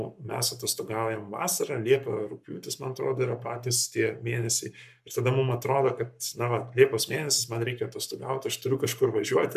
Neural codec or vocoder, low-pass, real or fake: codec, 44.1 kHz, 7.8 kbps, Pupu-Codec; 14.4 kHz; fake